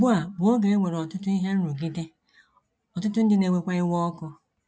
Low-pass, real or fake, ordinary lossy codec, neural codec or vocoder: none; real; none; none